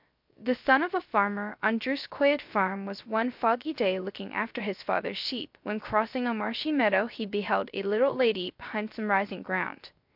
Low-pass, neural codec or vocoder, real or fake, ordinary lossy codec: 5.4 kHz; codec, 16 kHz, 0.3 kbps, FocalCodec; fake; MP3, 48 kbps